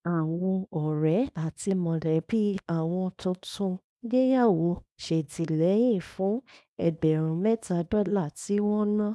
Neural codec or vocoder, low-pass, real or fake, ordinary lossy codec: codec, 24 kHz, 0.9 kbps, WavTokenizer, small release; none; fake; none